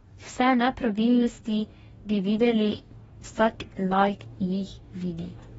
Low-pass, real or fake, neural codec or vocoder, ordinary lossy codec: 19.8 kHz; fake; codec, 44.1 kHz, 2.6 kbps, DAC; AAC, 24 kbps